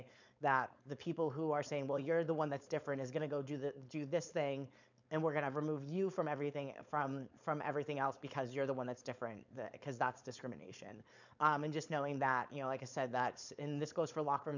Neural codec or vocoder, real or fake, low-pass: codec, 16 kHz, 4.8 kbps, FACodec; fake; 7.2 kHz